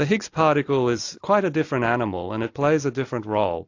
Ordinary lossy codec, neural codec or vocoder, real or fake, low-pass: AAC, 48 kbps; none; real; 7.2 kHz